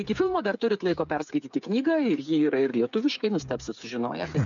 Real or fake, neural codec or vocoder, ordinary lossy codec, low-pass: fake; codec, 16 kHz, 8 kbps, FreqCodec, smaller model; MP3, 96 kbps; 7.2 kHz